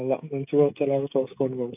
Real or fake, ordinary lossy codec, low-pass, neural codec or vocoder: fake; AAC, 32 kbps; 3.6 kHz; codec, 16 kHz, 16 kbps, FreqCodec, smaller model